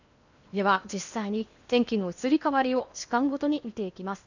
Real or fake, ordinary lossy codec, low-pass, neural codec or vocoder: fake; none; 7.2 kHz; codec, 16 kHz in and 24 kHz out, 0.8 kbps, FocalCodec, streaming, 65536 codes